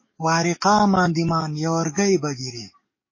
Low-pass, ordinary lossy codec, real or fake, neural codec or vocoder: 7.2 kHz; MP3, 32 kbps; fake; codec, 44.1 kHz, 7.8 kbps, DAC